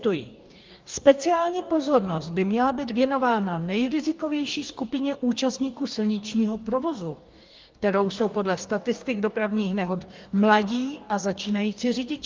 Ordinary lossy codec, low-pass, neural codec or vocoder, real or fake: Opus, 32 kbps; 7.2 kHz; codec, 44.1 kHz, 2.6 kbps, DAC; fake